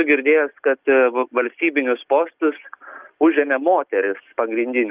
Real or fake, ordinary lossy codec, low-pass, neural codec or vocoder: real; Opus, 32 kbps; 3.6 kHz; none